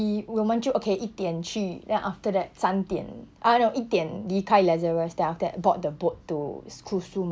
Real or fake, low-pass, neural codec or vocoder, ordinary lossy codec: real; none; none; none